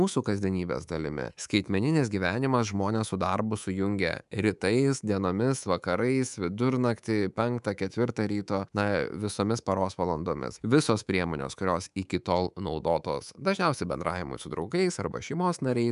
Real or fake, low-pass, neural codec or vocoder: fake; 10.8 kHz; codec, 24 kHz, 3.1 kbps, DualCodec